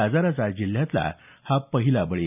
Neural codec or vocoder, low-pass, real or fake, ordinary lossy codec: none; 3.6 kHz; real; none